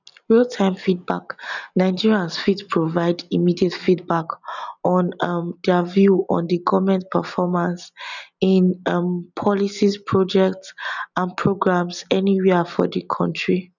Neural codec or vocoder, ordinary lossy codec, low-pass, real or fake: none; none; 7.2 kHz; real